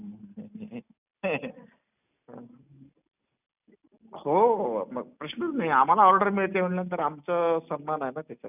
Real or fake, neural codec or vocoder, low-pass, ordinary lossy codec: real; none; 3.6 kHz; none